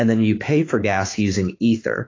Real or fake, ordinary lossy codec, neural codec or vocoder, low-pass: fake; AAC, 32 kbps; autoencoder, 48 kHz, 32 numbers a frame, DAC-VAE, trained on Japanese speech; 7.2 kHz